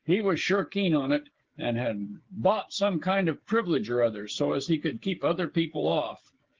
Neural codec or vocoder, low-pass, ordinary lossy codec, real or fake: codec, 16 kHz, 8 kbps, FreqCodec, smaller model; 7.2 kHz; Opus, 16 kbps; fake